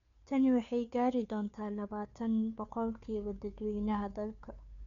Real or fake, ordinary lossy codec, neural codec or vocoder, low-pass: fake; none; codec, 16 kHz, 2 kbps, FunCodec, trained on Chinese and English, 25 frames a second; 7.2 kHz